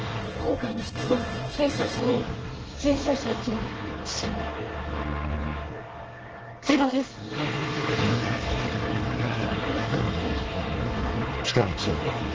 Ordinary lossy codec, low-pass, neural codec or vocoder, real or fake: Opus, 16 kbps; 7.2 kHz; codec, 24 kHz, 1 kbps, SNAC; fake